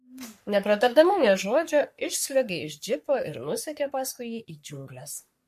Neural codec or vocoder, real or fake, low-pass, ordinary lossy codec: codec, 44.1 kHz, 3.4 kbps, Pupu-Codec; fake; 14.4 kHz; MP3, 64 kbps